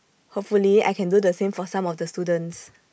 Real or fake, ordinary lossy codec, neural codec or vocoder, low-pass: real; none; none; none